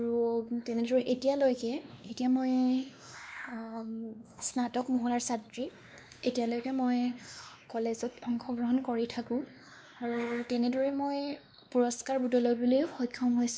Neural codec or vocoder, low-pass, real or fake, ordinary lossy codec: codec, 16 kHz, 2 kbps, X-Codec, WavLM features, trained on Multilingual LibriSpeech; none; fake; none